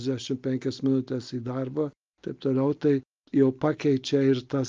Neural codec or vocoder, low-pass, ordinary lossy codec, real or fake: none; 7.2 kHz; Opus, 24 kbps; real